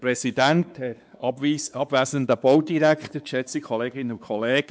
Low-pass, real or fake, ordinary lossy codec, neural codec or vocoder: none; fake; none; codec, 16 kHz, 4 kbps, X-Codec, WavLM features, trained on Multilingual LibriSpeech